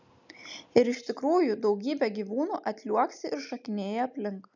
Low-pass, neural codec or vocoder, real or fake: 7.2 kHz; none; real